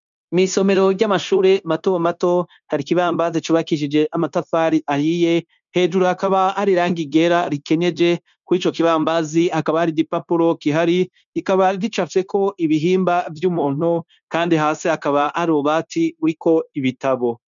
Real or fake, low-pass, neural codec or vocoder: fake; 7.2 kHz; codec, 16 kHz, 0.9 kbps, LongCat-Audio-Codec